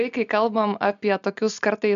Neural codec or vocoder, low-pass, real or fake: none; 7.2 kHz; real